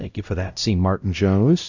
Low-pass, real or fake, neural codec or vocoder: 7.2 kHz; fake; codec, 16 kHz, 0.5 kbps, X-Codec, WavLM features, trained on Multilingual LibriSpeech